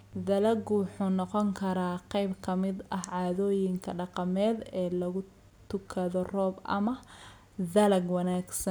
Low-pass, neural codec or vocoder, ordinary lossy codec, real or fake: none; none; none; real